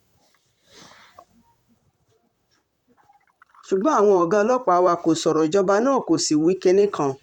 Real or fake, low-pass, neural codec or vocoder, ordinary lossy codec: fake; 19.8 kHz; vocoder, 44.1 kHz, 128 mel bands, Pupu-Vocoder; none